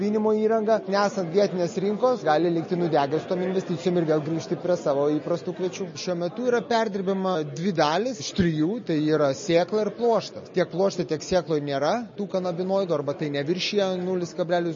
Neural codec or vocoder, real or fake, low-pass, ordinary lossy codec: none; real; 7.2 kHz; MP3, 32 kbps